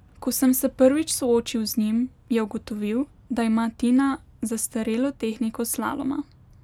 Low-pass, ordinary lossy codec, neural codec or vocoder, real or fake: 19.8 kHz; none; none; real